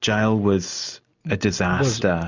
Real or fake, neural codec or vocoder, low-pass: real; none; 7.2 kHz